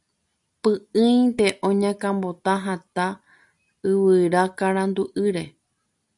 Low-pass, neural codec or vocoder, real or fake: 10.8 kHz; none; real